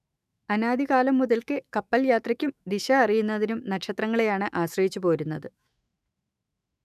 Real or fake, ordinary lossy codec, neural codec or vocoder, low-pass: fake; none; autoencoder, 48 kHz, 128 numbers a frame, DAC-VAE, trained on Japanese speech; 14.4 kHz